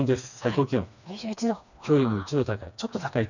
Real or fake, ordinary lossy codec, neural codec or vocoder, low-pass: fake; none; codec, 16 kHz, 2 kbps, FreqCodec, smaller model; 7.2 kHz